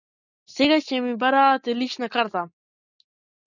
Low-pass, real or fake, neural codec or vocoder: 7.2 kHz; real; none